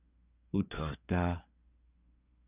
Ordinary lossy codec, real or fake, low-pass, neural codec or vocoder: Opus, 64 kbps; fake; 3.6 kHz; codec, 24 kHz, 1 kbps, SNAC